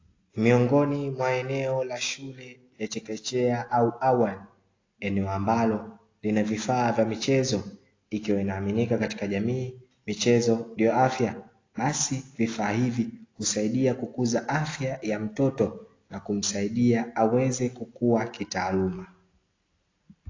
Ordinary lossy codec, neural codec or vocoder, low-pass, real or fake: AAC, 32 kbps; none; 7.2 kHz; real